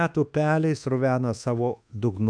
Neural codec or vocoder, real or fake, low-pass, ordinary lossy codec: codec, 24 kHz, 1.2 kbps, DualCodec; fake; 9.9 kHz; MP3, 96 kbps